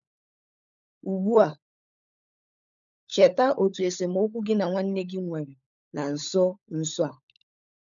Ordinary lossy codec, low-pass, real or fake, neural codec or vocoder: none; 7.2 kHz; fake; codec, 16 kHz, 16 kbps, FunCodec, trained on LibriTTS, 50 frames a second